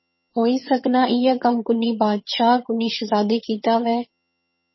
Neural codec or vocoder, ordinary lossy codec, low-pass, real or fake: vocoder, 22.05 kHz, 80 mel bands, HiFi-GAN; MP3, 24 kbps; 7.2 kHz; fake